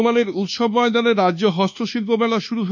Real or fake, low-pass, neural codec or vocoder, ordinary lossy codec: fake; 7.2 kHz; codec, 24 kHz, 1.2 kbps, DualCodec; none